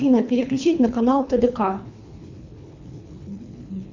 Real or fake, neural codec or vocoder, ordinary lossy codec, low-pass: fake; codec, 24 kHz, 3 kbps, HILCodec; MP3, 64 kbps; 7.2 kHz